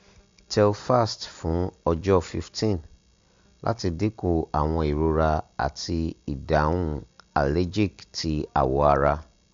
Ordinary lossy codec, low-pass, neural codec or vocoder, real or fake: MP3, 64 kbps; 7.2 kHz; none; real